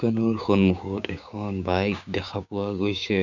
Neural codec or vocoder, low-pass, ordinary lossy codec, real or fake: codec, 16 kHz, 6 kbps, DAC; 7.2 kHz; none; fake